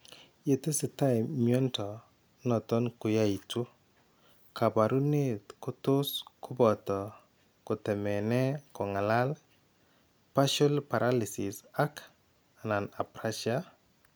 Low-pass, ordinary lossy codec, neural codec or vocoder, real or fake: none; none; none; real